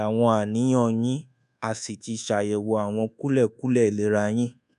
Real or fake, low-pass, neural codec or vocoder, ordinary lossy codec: fake; 10.8 kHz; codec, 24 kHz, 1.2 kbps, DualCodec; none